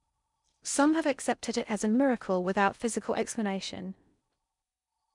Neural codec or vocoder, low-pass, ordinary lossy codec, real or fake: codec, 16 kHz in and 24 kHz out, 0.6 kbps, FocalCodec, streaming, 4096 codes; 10.8 kHz; none; fake